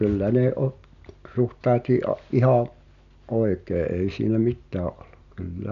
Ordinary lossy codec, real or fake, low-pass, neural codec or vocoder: none; real; 7.2 kHz; none